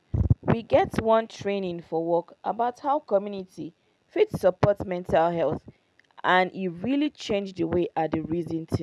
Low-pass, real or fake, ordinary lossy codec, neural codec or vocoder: none; real; none; none